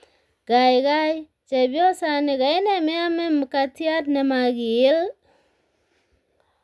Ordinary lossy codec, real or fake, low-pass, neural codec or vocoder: none; real; none; none